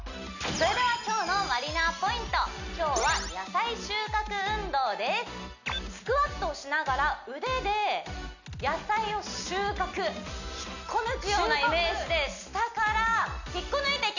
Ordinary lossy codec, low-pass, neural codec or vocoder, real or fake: none; 7.2 kHz; none; real